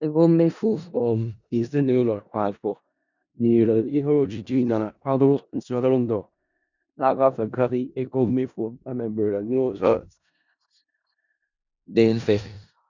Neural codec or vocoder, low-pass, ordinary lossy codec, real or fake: codec, 16 kHz in and 24 kHz out, 0.4 kbps, LongCat-Audio-Codec, four codebook decoder; 7.2 kHz; none; fake